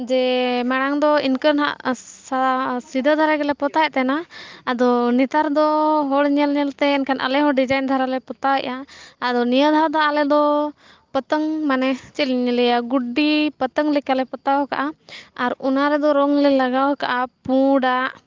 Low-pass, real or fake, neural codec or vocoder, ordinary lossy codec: 7.2 kHz; real; none; Opus, 32 kbps